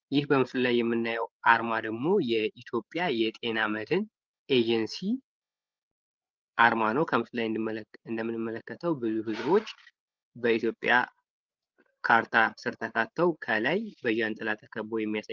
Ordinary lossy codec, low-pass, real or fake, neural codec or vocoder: Opus, 32 kbps; 7.2 kHz; fake; codec, 16 kHz, 8 kbps, FreqCodec, larger model